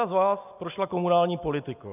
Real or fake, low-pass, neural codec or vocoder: real; 3.6 kHz; none